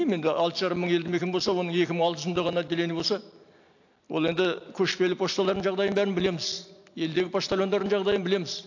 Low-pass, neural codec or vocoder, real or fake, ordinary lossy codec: 7.2 kHz; none; real; AAC, 48 kbps